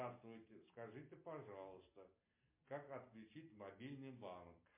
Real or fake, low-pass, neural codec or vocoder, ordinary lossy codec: real; 3.6 kHz; none; MP3, 24 kbps